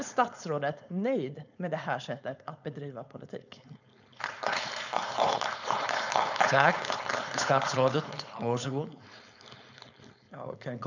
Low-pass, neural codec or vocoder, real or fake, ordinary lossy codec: 7.2 kHz; codec, 16 kHz, 4.8 kbps, FACodec; fake; none